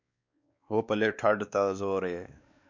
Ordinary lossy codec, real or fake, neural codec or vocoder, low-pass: MP3, 64 kbps; fake; codec, 16 kHz, 2 kbps, X-Codec, WavLM features, trained on Multilingual LibriSpeech; 7.2 kHz